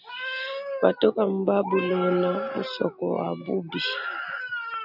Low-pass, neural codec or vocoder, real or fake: 5.4 kHz; none; real